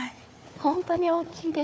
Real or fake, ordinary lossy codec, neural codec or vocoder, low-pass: fake; none; codec, 16 kHz, 4 kbps, FunCodec, trained on Chinese and English, 50 frames a second; none